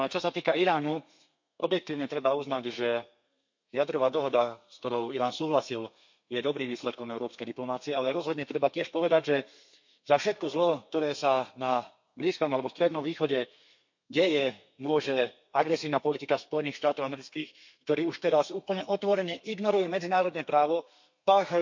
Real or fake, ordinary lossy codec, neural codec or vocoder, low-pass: fake; MP3, 48 kbps; codec, 32 kHz, 1.9 kbps, SNAC; 7.2 kHz